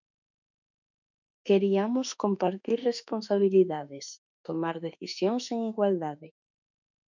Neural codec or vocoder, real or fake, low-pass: autoencoder, 48 kHz, 32 numbers a frame, DAC-VAE, trained on Japanese speech; fake; 7.2 kHz